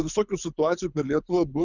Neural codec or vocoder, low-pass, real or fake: codec, 24 kHz, 6 kbps, HILCodec; 7.2 kHz; fake